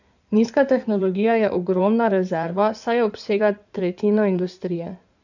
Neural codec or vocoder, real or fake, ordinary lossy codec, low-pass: codec, 16 kHz in and 24 kHz out, 2.2 kbps, FireRedTTS-2 codec; fake; none; 7.2 kHz